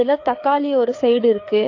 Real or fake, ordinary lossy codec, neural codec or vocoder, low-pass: fake; AAC, 48 kbps; codec, 16 kHz, 4 kbps, FreqCodec, larger model; 7.2 kHz